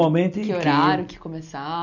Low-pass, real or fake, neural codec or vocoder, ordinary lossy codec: 7.2 kHz; real; none; none